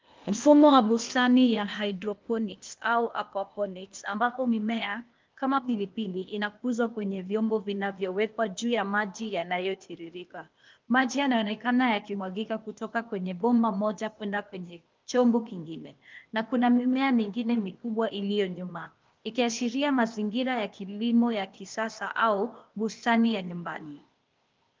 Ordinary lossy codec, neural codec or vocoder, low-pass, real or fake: Opus, 24 kbps; codec, 16 kHz, 0.8 kbps, ZipCodec; 7.2 kHz; fake